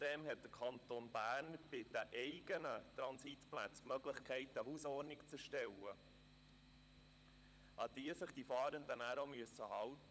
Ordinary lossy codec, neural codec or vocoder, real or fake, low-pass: none; codec, 16 kHz, 16 kbps, FunCodec, trained on LibriTTS, 50 frames a second; fake; none